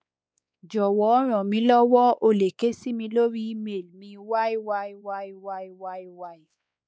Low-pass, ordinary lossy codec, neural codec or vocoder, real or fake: none; none; codec, 16 kHz, 4 kbps, X-Codec, WavLM features, trained on Multilingual LibriSpeech; fake